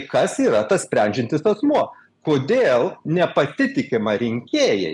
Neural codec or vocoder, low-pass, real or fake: none; 10.8 kHz; real